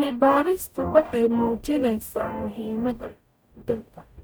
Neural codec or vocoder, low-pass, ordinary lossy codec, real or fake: codec, 44.1 kHz, 0.9 kbps, DAC; none; none; fake